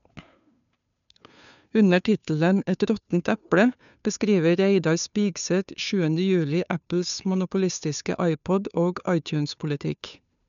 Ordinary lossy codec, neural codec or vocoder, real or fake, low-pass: none; codec, 16 kHz, 2 kbps, FunCodec, trained on Chinese and English, 25 frames a second; fake; 7.2 kHz